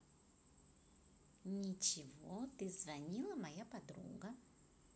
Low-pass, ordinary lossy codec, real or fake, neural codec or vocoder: none; none; real; none